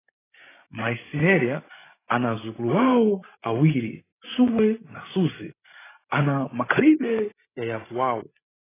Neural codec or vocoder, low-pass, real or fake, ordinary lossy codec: codec, 16 kHz, 16 kbps, FreqCodec, larger model; 3.6 kHz; fake; AAC, 16 kbps